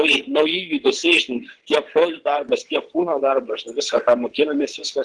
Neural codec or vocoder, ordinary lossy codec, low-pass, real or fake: vocoder, 22.05 kHz, 80 mel bands, WaveNeXt; Opus, 16 kbps; 9.9 kHz; fake